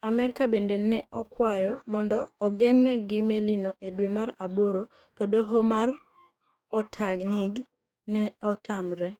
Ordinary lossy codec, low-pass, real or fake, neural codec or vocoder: MP3, 96 kbps; 19.8 kHz; fake; codec, 44.1 kHz, 2.6 kbps, DAC